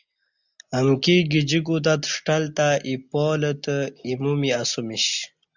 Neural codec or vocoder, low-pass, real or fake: none; 7.2 kHz; real